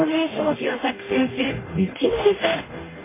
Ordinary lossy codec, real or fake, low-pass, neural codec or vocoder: MP3, 16 kbps; fake; 3.6 kHz; codec, 44.1 kHz, 0.9 kbps, DAC